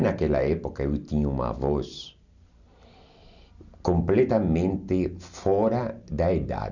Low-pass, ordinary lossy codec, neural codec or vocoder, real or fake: 7.2 kHz; none; none; real